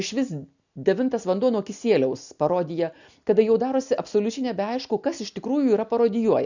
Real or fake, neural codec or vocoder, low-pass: real; none; 7.2 kHz